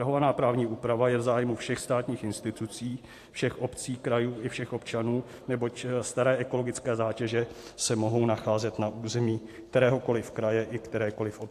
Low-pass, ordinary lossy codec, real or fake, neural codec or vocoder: 14.4 kHz; AAC, 64 kbps; fake; vocoder, 44.1 kHz, 128 mel bands every 512 samples, BigVGAN v2